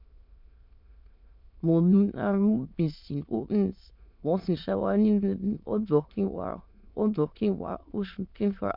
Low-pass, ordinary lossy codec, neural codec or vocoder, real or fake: 5.4 kHz; MP3, 48 kbps; autoencoder, 22.05 kHz, a latent of 192 numbers a frame, VITS, trained on many speakers; fake